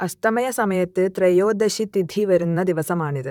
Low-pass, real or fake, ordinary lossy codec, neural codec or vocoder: 19.8 kHz; fake; none; vocoder, 44.1 kHz, 128 mel bands, Pupu-Vocoder